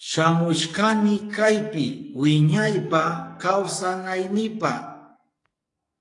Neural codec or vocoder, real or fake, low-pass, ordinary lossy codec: codec, 44.1 kHz, 2.6 kbps, SNAC; fake; 10.8 kHz; AAC, 48 kbps